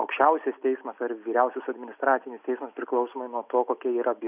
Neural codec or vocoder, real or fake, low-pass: none; real; 3.6 kHz